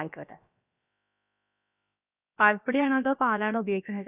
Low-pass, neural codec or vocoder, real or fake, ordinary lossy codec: 3.6 kHz; codec, 16 kHz, about 1 kbps, DyCAST, with the encoder's durations; fake; none